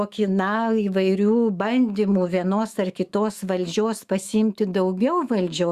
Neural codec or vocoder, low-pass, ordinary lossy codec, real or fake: autoencoder, 48 kHz, 128 numbers a frame, DAC-VAE, trained on Japanese speech; 14.4 kHz; Opus, 64 kbps; fake